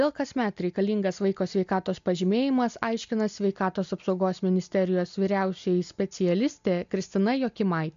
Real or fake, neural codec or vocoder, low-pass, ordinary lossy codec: real; none; 7.2 kHz; MP3, 64 kbps